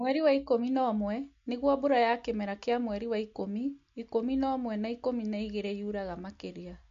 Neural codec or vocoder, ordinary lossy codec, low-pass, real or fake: none; MP3, 48 kbps; 7.2 kHz; real